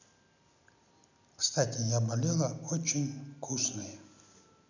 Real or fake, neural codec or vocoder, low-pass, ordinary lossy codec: real; none; 7.2 kHz; none